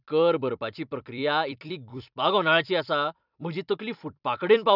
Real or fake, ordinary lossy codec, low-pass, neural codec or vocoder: fake; none; 5.4 kHz; vocoder, 44.1 kHz, 128 mel bands every 256 samples, BigVGAN v2